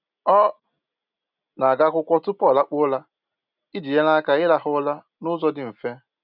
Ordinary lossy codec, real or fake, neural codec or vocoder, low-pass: none; real; none; 5.4 kHz